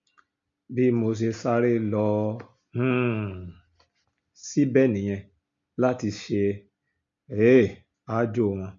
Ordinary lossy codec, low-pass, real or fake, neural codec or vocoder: MP3, 64 kbps; 7.2 kHz; real; none